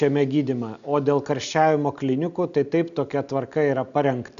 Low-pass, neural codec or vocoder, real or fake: 7.2 kHz; none; real